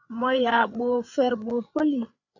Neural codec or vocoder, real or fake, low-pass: codec, 16 kHz, 8 kbps, FreqCodec, larger model; fake; 7.2 kHz